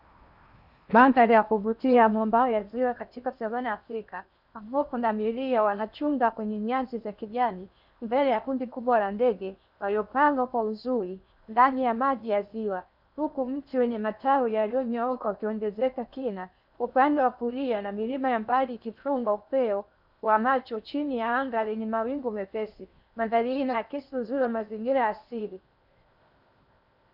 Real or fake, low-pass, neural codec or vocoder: fake; 5.4 kHz; codec, 16 kHz in and 24 kHz out, 0.8 kbps, FocalCodec, streaming, 65536 codes